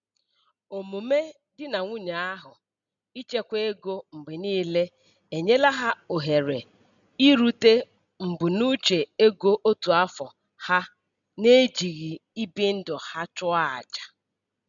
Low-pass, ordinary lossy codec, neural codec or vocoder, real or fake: 7.2 kHz; none; none; real